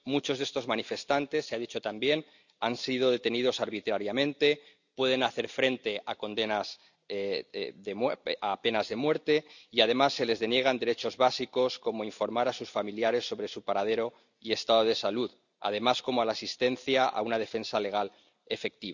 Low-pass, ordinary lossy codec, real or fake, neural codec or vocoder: 7.2 kHz; none; real; none